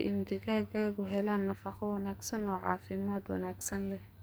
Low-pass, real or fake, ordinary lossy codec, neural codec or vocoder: none; fake; none; codec, 44.1 kHz, 2.6 kbps, SNAC